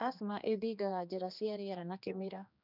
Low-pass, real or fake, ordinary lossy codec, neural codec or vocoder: 5.4 kHz; fake; none; codec, 16 kHz, 2 kbps, X-Codec, HuBERT features, trained on general audio